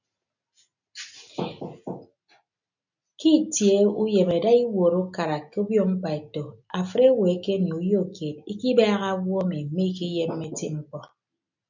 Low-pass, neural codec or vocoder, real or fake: 7.2 kHz; none; real